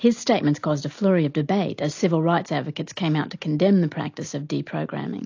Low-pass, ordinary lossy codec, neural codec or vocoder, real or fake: 7.2 kHz; AAC, 48 kbps; none; real